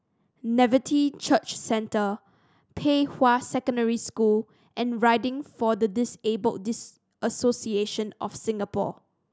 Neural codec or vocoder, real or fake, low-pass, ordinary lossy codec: none; real; none; none